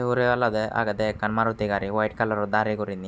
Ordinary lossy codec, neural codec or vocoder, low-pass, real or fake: none; none; none; real